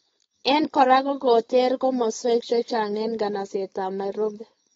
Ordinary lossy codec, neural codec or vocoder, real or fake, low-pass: AAC, 24 kbps; codec, 16 kHz, 4.8 kbps, FACodec; fake; 7.2 kHz